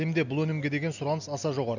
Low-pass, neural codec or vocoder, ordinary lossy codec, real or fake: 7.2 kHz; none; none; real